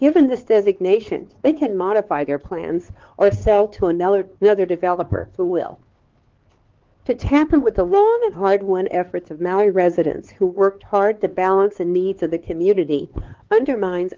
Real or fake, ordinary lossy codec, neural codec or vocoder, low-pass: fake; Opus, 16 kbps; codec, 16 kHz, 4 kbps, X-Codec, HuBERT features, trained on LibriSpeech; 7.2 kHz